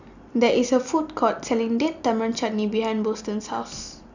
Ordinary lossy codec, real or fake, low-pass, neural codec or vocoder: none; real; 7.2 kHz; none